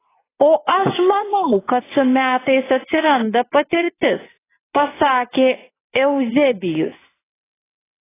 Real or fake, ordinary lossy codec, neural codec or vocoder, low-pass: fake; AAC, 16 kbps; vocoder, 22.05 kHz, 80 mel bands, WaveNeXt; 3.6 kHz